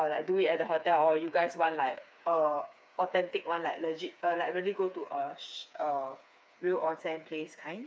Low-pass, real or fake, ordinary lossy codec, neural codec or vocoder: none; fake; none; codec, 16 kHz, 4 kbps, FreqCodec, smaller model